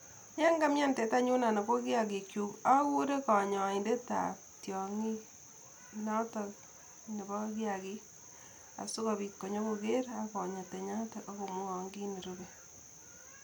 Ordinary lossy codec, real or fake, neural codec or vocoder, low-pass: none; real; none; 19.8 kHz